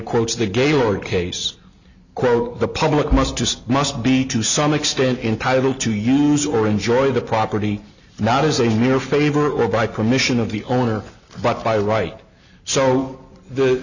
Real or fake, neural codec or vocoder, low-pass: real; none; 7.2 kHz